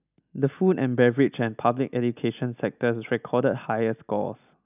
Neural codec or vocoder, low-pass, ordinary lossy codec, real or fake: none; 3.6 kHz; none; real